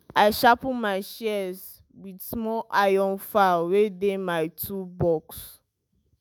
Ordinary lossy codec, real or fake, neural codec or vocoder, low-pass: none; fake; autoencoder, 48 kHz, 128 numbers a frame, DAC-VAE, trained on Japanese speech; none